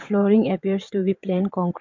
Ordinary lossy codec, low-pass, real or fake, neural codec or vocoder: MP3, 48 kbps; 7.2 kHz; fake; vocoder, 22.05 kHz, 80 mel bands, WaveNeXt